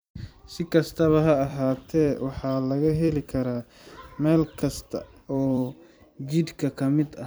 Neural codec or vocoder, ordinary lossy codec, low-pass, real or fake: vocoder, 44.1 kHz, 128 mel bands every 256 samples, BigVGAN v2; none; none; fake